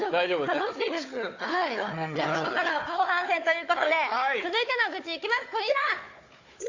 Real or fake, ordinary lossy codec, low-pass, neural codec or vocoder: fake; AAC, 48 kbps; 7.2 kHz; codec, 16 kHz, 4 kbps, FunCodec, trained on Chinese and English, 50 frames a second